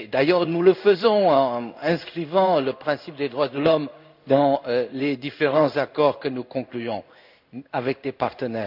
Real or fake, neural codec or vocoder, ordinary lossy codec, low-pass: fake; codec, 16 kHz in and 24 kHz out, 1 kbps, XY-Tokenizer; none; 5.4 kHz